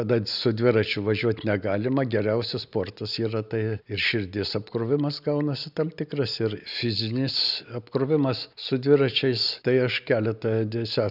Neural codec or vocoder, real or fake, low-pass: none; real; 5.4 kHz